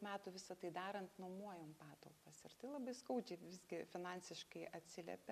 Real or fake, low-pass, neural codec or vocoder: real; 14.4 kHz; none